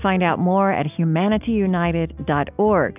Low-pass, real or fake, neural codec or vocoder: 3.6 kHz; real; none